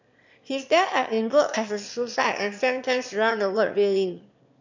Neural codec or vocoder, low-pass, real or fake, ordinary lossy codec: autoencoder, 22.05 kHz, a latent of 192 numbers a frame, VITS, trained on one speaker; 7.2 kHz; fake; MP3, 64 kbps